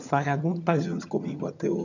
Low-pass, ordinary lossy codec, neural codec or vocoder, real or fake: 7.2 kHz; none; vocoder, 22.05 kHz, 80 mel bands, HiFi-GAN; fake